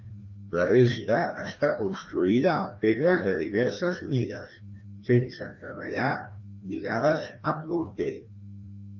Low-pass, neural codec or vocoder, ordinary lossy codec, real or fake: 7.2 kHz; codec, 16 kHz, 1 kbps, FreqCodec, larger model; Opus, 32 kbps; fake